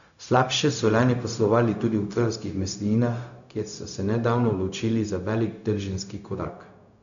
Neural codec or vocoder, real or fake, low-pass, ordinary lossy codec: codec, 16 kHz, 0.4 kbps, LongCat-Audio-Codec; fake; 7.2 kHz; none